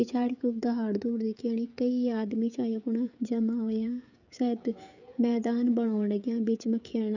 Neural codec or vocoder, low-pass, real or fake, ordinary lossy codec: codec, 16 kHz, 16 kbps, FreqCodec, smaller model; 7.2 kHz; fake; none